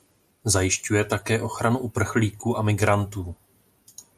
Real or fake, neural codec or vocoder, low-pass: real; none; 14.4 kHz